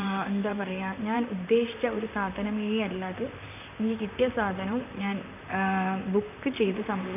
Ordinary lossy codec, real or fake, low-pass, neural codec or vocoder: none; fake; 3.6 kHz; vocoder, 44.1 kHz, 128 mel bands, Pupu-Vocoder